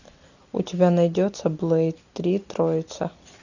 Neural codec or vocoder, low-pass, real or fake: none; 7.2 kHz; real